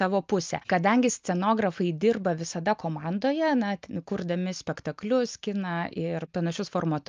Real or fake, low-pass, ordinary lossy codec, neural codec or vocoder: real; 7.2 kHz; Opus, 24 kbps; none